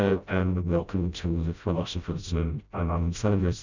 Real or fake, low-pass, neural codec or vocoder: fake; 7.2 kHz; codec, 16 kHz, 0.5 kbps, FreqCodec, smaller model